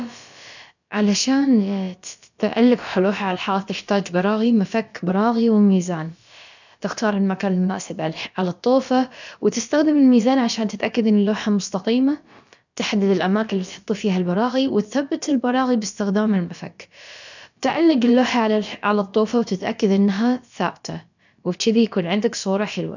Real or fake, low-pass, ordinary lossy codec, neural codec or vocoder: fake; 7.2 kHz; none; codec, 16 kHz, about 1 kbps, DyCAST, with the encoder's durations